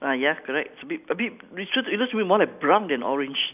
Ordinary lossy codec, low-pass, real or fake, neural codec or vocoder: none; 3.6 kHz; real; none